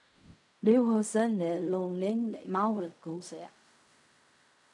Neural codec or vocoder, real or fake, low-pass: codec, 16 kHz in and 24 kHz out, 0.4 kbps, LongCat-Audio-Codec, fine tuned four codebook decoder; fake; 10.8 kHz